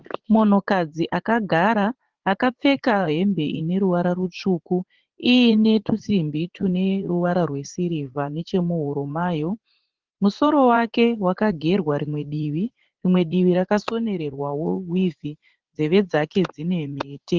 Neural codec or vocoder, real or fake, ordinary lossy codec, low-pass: vocoder, 44.1 kHz, 80 mel bands, Vocos; fake; Opus, 16 kbps; 7.2 kHz